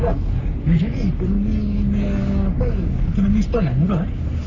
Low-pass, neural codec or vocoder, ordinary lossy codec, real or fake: 7.2 kHz; codec, 44.1 kHz, 3.4 kbps, Pupu-Codec; none; fake